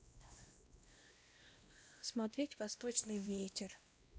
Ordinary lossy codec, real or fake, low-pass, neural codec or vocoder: none; fake; none; codec, 16 kHz, 1 kbps, X-Codec, WavLM features, trained on Multilingual LibriSpeech